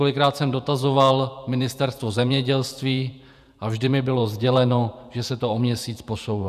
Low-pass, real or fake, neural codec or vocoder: 14.4 kHz; fake; vocoder, 48 kHz, 128 mel bands, Vocos